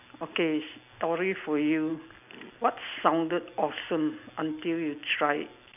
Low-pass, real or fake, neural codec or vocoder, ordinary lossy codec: 3.6 kHz; real; none; none